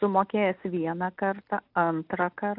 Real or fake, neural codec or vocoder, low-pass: real; none; 5.4 kHz